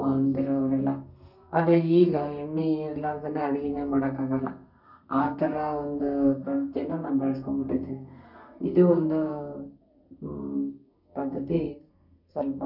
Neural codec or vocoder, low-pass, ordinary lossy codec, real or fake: codec, 32 kHz, 1.9 kbps, SNAC; 5.4 kHz; none; fake